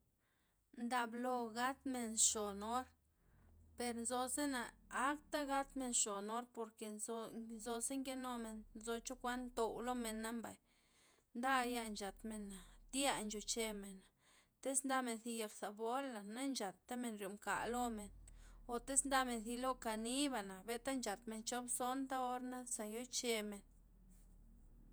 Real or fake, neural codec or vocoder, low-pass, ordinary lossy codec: fake; vocoder, 48 kHz, 128 mel bands, Vocos; none; none